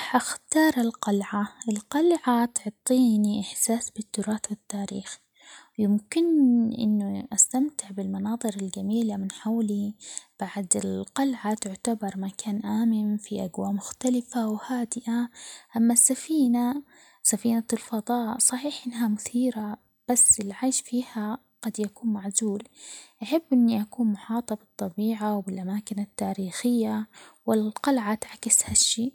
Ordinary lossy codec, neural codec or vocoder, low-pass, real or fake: none; none; none; real